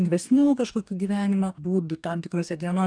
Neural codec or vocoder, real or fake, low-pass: codec, 44.1 kHz, 2.6 kbps, DAC; fake; 9.9 kHz